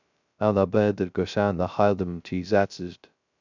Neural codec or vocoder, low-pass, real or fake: codec, 16 kHz, 0.2 kbps, FocalCodec; 7.2 kHz; fake